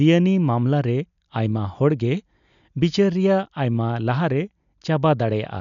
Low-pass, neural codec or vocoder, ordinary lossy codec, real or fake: 7.2 kHz; none; MP3, 96 kbps; real